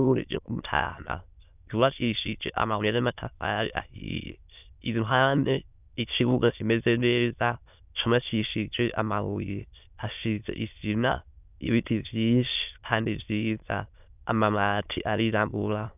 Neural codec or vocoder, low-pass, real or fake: autoencoder, 22.05 kHz, a latent of 192 numbers a frame, VITS, trained on many speakers; 3.6 kHz; fake